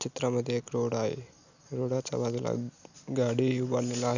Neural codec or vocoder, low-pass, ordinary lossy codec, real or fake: none; 7.2 kHz; none; real